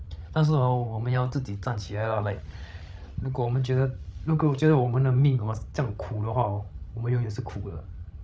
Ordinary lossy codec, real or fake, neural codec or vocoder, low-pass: none; fake; codec, 16 kHz, 16 kbps, FreqCodec, larger model; none